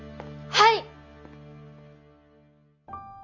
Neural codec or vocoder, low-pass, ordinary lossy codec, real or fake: none; 7.2 kHz; none; real